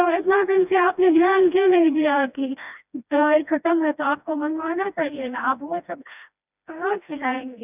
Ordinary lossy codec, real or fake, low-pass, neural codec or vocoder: none; fake; 3.6 kHz; codec, 16 kHz, 1 kbps, FreqCodec, smaller model